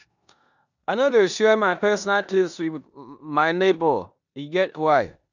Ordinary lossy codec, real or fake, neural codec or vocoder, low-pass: none; fake; codec, 16 kHz in and 24 kHz out, 0.9 kbps, LongCat-Audio-Codec, four codebook decoder; 7.2 kHz